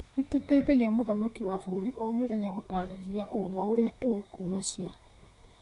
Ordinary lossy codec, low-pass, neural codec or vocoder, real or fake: none; 10.8 kHz; codec, 24 kHz, 1 kbps, SNAC; fake